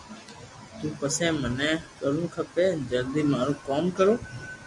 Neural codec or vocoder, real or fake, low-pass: none; real; 10.8 kHz